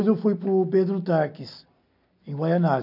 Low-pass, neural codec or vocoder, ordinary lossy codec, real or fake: 5.4 kHz; none; none; real